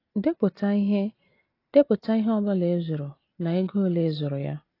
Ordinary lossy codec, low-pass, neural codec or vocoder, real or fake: AAC, 24 kbps; 5.4 kHz; none; real